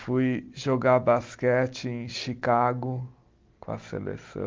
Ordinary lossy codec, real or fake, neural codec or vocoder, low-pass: Opus, 32 kbps; real; none; 7.2 kHz